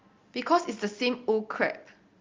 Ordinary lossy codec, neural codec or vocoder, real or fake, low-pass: Opus, 32 kbps; none; real; 7.2 kHz